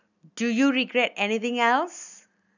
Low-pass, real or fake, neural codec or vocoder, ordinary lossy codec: 7.2 kHz; real; none; none